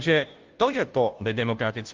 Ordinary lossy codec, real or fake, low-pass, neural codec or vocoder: Opus, 24 kbps; fake; 7.2 kHz; codec, 16 kHz, 0.5 kbps, FunCodec, trained on Chinese and English, 25 frames a second